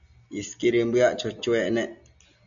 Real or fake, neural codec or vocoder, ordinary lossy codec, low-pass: real; none; MP3, 96 kbps; 7.2 kHz